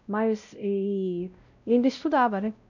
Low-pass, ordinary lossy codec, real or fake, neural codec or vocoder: 7.2 kHz; none; fake; codec, 16 kHz, 0.5 kbps, X-Codec, WavLM features, trained on Multilingual LibriSpeech